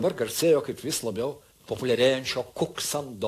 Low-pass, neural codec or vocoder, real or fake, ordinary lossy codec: 14.4 kHz; none; real; AAC, 64 kbps